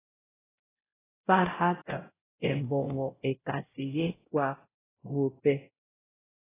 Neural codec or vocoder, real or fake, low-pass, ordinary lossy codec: codec, 16 kHz, 0.5 kbps, X-Codec, WavLM features, trained on Multilingual LibriSpeech; fake; 3.6 kHz; AAC, 16 kbps